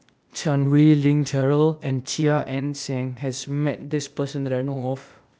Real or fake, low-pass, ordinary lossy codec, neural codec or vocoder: fake; none; none; codec, 16 kHz, 0.8 kbps, ZipCodec